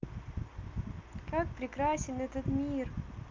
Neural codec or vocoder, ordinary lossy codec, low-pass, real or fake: none; Opus, 24 kbps; 7.2 kHz; real